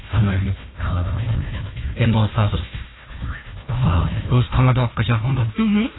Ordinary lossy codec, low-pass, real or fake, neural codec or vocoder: AAC, 16 kbps; 7.2 kHz; fake; codec, 16 kHz, 1 kbps, FunCodec, trained on Chinese and English, 50 frames a second